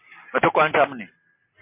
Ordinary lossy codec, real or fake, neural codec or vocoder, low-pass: MP3, 24 kbps; real; none; 3.6 kHz